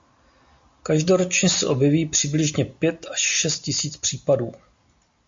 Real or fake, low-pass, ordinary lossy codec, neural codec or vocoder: real; 7.2 kHz; MP3, 48 kbps; none